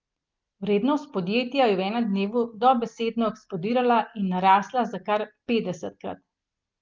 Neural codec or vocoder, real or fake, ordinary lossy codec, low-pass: none; real; Opus, 32 kbps; 7.2 kHz